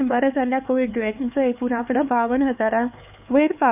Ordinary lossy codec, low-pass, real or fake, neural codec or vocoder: none; 3.6 kHz; fake; codec, 16 kHz, 4 kbps, X-Codec, HuBERT features, trained on LibriSpeech